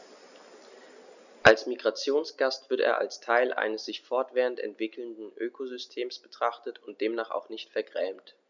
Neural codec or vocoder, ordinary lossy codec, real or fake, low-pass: none; none; real; 7.2 kHz